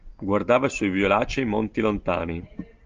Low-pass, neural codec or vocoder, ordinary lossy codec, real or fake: 7.2 kHz; none; Opus, 24 kbps; real